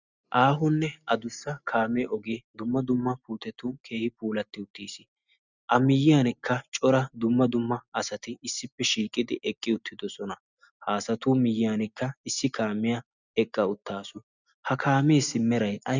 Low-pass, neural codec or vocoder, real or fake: 7.2 kHz; none; real